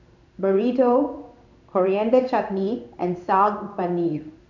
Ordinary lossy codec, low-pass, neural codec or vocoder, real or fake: none; 7.2 kHz; codec, 16 kHz in and 24 kHz out, 1 kbps, XY-Tokenizer; fake